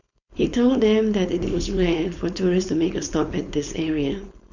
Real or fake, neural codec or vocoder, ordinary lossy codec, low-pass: fake; codec, 16 kHz, 4.8 kbps, FACodec; none; 7.2 kHz